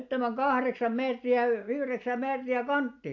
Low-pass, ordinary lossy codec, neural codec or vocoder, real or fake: 7.2 kHz; AAC, 48 kbps; none; real